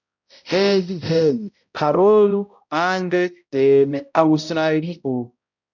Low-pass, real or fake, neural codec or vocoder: 7.2 kHz; fake; codec, 16 kHz, 0.5 kbps, X-Codec, HuBERT features, trained on balanced general audio